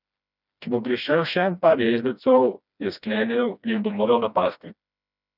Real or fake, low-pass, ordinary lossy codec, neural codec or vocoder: fake; 5.4 kHz; none; codec, 16 kHz, 1 kbps, FreqCodec, smaller model